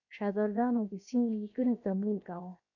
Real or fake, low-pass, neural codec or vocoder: fake; 7.2 kHz; codec, 16 kHz, 0.7 kbps, FocalCodec